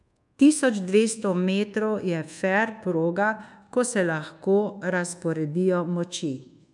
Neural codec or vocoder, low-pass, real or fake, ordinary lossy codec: codec, 24 kHz, 1.2 kbps, DualCodec; 10.8 kHz; fake; none